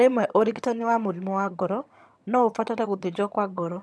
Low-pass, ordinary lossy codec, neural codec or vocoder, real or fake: none; none; vocoder, 22.05 kHz, 80 mel bands, HiFi-GAN; fake